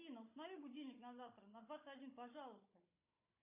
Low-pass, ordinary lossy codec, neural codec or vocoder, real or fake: 3.6 kHz; AAC, 32 kbps; codec, 16 kHz, 16 kbps, FunCodec, trained on Chinese and English, 50 frames a second; fake